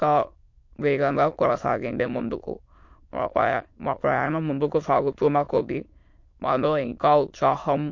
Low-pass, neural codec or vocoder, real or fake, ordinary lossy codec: 7.2 kHz; autoencoder, 22.05 kHz, a latent of 192 numbers a frame, VITS, trained on many speakers; fake; MP3, 48 kbps